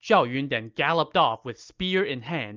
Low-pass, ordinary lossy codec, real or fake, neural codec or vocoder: 7.2 kHz; Opus, 24 kbps; real; none